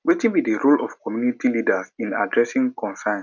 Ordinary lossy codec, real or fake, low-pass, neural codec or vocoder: none; real; 7.2 kHz; none